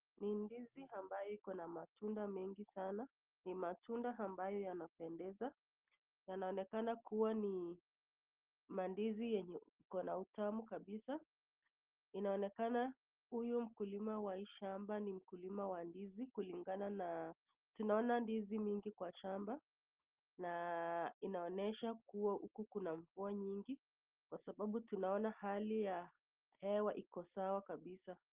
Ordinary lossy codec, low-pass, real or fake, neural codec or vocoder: Opus, 32 kbps; 3.6 kHz; real; none